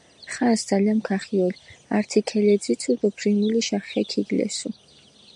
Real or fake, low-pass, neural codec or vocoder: real; 10.8 kHz; none